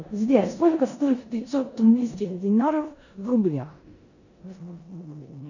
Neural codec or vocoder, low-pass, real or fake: codec, 16 kHz in and 24 kHz out, 0.9 kbps, LongCat-Audio-Codec, four codebook decoder; 7.2 kHz; fake